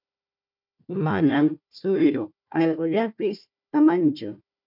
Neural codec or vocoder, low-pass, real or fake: codec, 16 kHz, 1 kbps, FunCodec, trained on Chinese and English, 50 frames a second; 5.4 kHz; fake